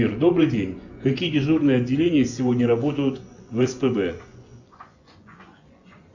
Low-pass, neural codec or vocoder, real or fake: 7.2 kHz; vocoder, 24 kHz, 100 mel bands, Vocos; fake